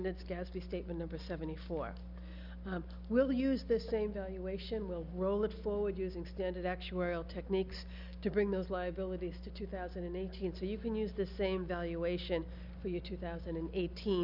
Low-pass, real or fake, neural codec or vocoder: 5.4 kHz; real; none